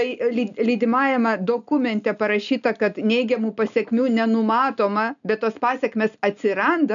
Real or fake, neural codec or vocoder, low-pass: real; none; 7.2 kHz